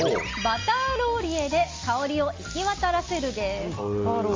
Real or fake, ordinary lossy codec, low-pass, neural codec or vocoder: real; Opus, 32 kbps; 7.2 kHz; none